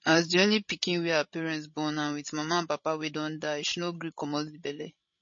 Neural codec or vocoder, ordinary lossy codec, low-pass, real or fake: codec, 16 kHz, 16 kbps, FreqCodec, larger model; MP3, 32 kbps; 7.2 kHz; fake